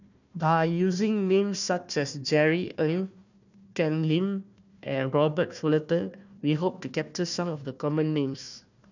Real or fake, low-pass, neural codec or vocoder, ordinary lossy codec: fake; 7.2 kHz; codec, 16 kHz, 1 kbps, FunCodec, trained on Chinese and English, 50 frames a second; none